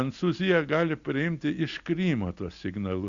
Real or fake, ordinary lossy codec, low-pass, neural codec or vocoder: real; Opus, 64 kbps; 7.2 kHz; none